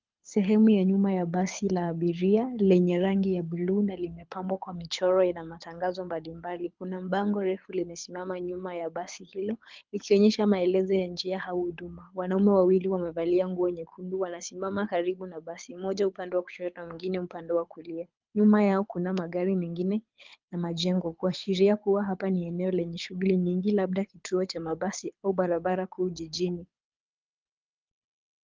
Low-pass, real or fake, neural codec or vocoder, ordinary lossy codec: 7.2 kHz; fake; codec, 24 kHz, 6 kbps, HILCodec; Opus, 32 kbps